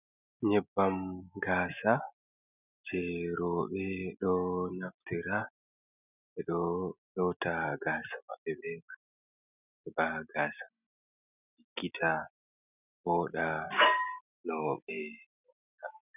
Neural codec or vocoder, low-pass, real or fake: none; 3.6 kHz; real